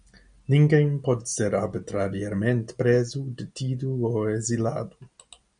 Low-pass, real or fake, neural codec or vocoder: 9.9 kHz; real; none